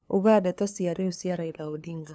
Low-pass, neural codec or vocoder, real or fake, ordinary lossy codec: none; codec, 16 kHz, 2 kbps, FunCodec, trained on LibriTTS, 25 frames a second; fake; none